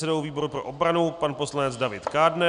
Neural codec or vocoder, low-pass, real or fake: none; 9.9 kHz; real